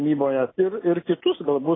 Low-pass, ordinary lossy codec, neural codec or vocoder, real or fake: 7.2 kHz; AAC, 16 kbps; none; real